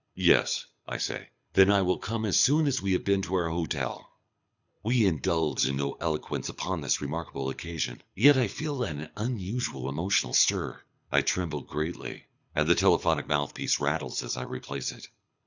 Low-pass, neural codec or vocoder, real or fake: 7.2 kHz; codec, 24 kHz, 6 kbps, HILCodec; fake